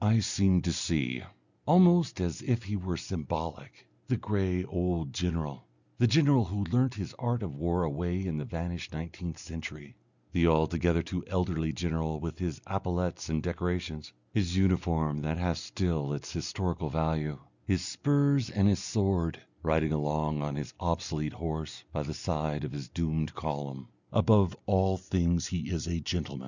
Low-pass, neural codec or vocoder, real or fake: 7.2 kHz; none; real